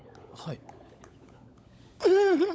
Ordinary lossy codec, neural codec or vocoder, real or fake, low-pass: none; codec, 16 kHz, 16 kbps, FunCodec, trained on LibriTTS, 50 frames a second; fake; none